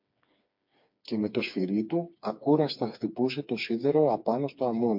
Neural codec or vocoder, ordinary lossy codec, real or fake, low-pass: codec, 16 kHz, 4 kbps, FreqCodec, smaller model; MP3, 48 kbps; fake; 5.4 kHz